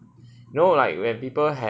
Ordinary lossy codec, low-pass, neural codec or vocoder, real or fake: none; none; none; real